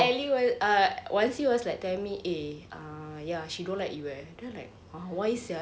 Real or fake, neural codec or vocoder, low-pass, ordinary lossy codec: real; none; none; none